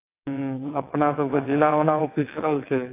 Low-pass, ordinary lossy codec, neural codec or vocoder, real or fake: 3.6 kHz; AAC, 24 kbps; vocoder, 22.05 kHz, 80 mel bands, WaveNeXt; fake